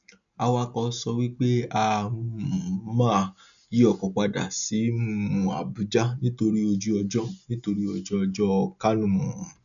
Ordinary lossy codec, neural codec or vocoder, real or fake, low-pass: none; none; real; 7.2 kHz